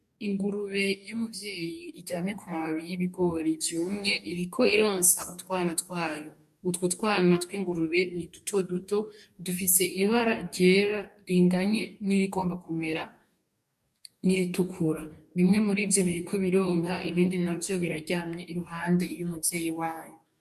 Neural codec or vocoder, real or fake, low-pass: codec, 44.1 kHz, 2.6 kbps, DAC; fake; 14.4 kHz